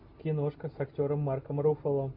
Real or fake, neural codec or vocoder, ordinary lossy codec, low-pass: real; none; MP3, 48 kbps; 5.4 kHz